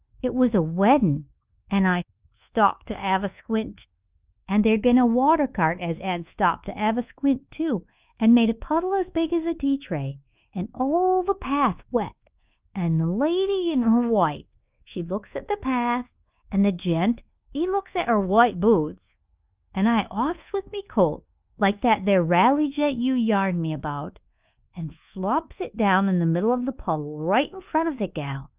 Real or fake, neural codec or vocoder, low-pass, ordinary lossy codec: fake; codec, 24 kHz, 1.2 kbps, DualCodec; 3.6 kHz; Opus, 32 kbps